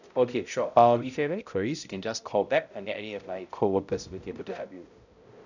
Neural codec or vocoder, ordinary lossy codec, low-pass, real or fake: codec, 16 kHz, 0.5 kbps, X-Codec, HuBERT features, trained on balanced general audio; none; 7.2 kHz; fake